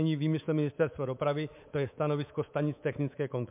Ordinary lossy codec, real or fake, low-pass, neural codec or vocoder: MP3, 32 kbps; fake; 3.6 kHz; codec, 24 kHz, 3.1 kbps, DualCodec